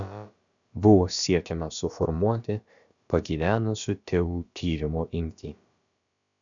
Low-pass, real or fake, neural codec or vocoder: 7.2 kHz; fake; codec, 16 kHz, about 1 kbps, DyCAST, with the encoder's durations